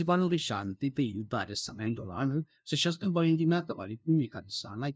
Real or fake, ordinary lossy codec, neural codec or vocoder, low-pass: fake; none; codec, 16 kHz, 0.5 kbps, FunCodec, trained on LibriTTS, 25 frames a second; none